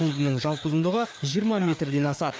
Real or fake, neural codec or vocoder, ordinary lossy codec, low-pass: fake; codec, 16 kHz, 4 kbps, FreqCodec, larger model; none; none